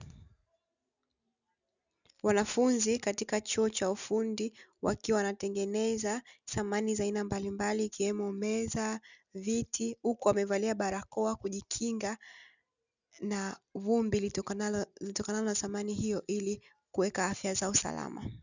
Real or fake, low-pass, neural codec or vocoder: real; 7.2 kHz; none